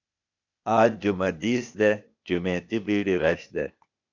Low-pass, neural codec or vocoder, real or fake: 7.2 kHz; codec, 16 kHz, 0.8 kbps, ZipCodec; fake